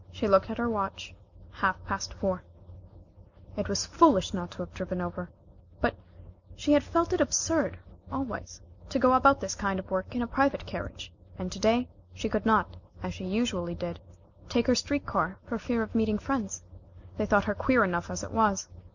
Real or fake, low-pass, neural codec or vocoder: real; 7.2 kHz; none